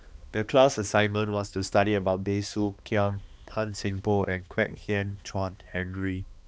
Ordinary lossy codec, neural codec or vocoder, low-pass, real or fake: none; codec, 16 kHz, 2 kbps, X-Codec, HuBERT features, trained on balanced general audio; none; fake